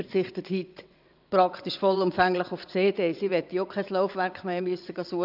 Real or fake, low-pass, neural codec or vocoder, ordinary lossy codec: fake; 5.4 kHz; vocoder, 22.05 kHz, 80 mel bands, WaveNeXt; none